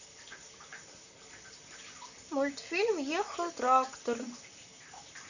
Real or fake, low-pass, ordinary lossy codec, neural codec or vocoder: fake; 7.2 kHz; none; vocoder, 44.1 kHz, 128 mel bands, Pupu-Vocoder